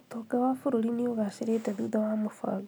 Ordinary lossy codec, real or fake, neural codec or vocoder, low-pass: none; real; none; none